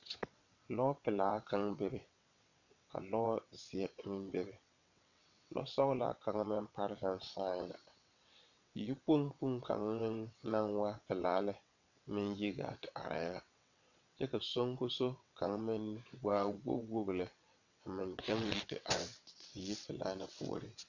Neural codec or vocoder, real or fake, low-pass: vocoder, 22.05 kHz, 80 mel bands, WaveNeXt; fake; 7.2 kHz